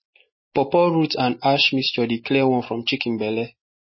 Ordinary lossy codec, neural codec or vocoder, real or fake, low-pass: MP3, 24 kbps; none; real; 7.2 kHz